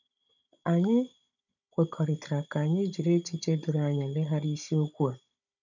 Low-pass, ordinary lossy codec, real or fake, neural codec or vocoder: 7.2 kHz; none; fake; codec, 16 kHz, 16 kbps, FunCodec, trained on Chinese and English, 50 frames a second